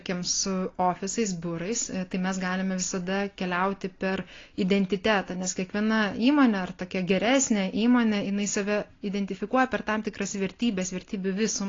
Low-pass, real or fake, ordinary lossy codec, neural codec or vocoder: 7.2 kHz; real; AAC, 32 kbps; none